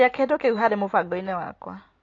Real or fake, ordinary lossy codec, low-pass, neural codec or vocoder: real; AAC, 32 kbps; 7.2 kHz; none